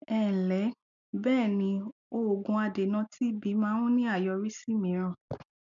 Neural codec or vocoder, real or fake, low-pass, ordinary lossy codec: none; real; 7.2 kHz; none